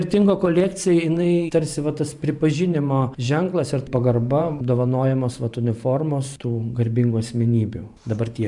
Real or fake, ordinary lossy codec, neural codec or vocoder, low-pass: fake; MP3, 96 kbps; vocoder, 44.1 kHz, 128 mel bands every 512 samples, BigVGAN v2; 10.8 kHz